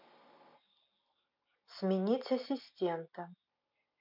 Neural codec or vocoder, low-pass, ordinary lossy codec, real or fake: none; 5.4 kHz; MP3, 48 kbps; real